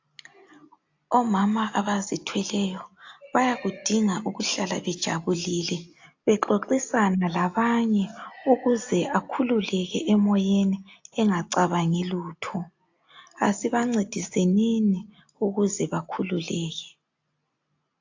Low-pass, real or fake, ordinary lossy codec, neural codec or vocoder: 7.2 kHz; real; AAC, 48 kbps; none